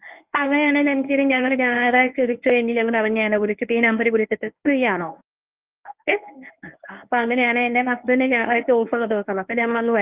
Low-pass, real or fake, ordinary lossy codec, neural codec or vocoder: 3.6 kHz; fake; Opus, 24 kbps; codec, 24 kHz, 0.9 kbps, WavTokenizer, medium speech release version 1